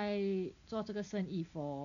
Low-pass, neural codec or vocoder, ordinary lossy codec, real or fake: 7.2 kHz; none; none; real